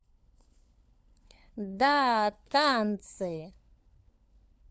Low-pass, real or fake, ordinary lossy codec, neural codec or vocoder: none; fake; none; codec, 16 kHz, 4 kbps, FunCodec, trained on LibriTTS, 50 frames a second